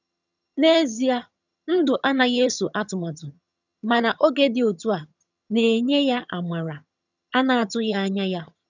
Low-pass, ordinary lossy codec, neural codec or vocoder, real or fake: 7.2 kHz; none; vocoder, 22.05 kHz, 80 mel bands, HiFi-GAN; fake